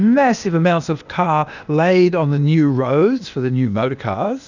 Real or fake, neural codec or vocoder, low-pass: fake; codec, 16 kHz, 0.8 kbps, ZipCodec; 7.2 kHz